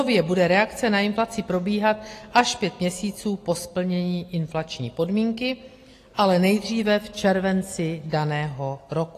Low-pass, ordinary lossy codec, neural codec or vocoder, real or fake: 14.4 kHz; AAC, 48 kbps; none; real